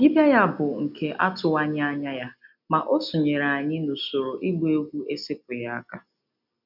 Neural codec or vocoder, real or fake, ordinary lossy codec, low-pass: none; real; none; 5.4 kHz